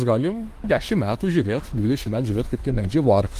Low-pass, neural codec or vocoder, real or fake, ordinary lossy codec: 14.4 kHz; autoencoder, 48 kHz, 32 numbers a frame, DAC-VAE, trained on Japanese speech; fake; Opus, 24 kbps